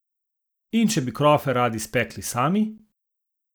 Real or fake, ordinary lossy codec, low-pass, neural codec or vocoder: real; none; none; none